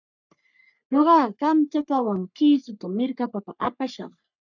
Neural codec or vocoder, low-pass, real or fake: codec, 44.1 kHz, 3.4 kbps, Pupu-Codec; 7.2 kHz; fake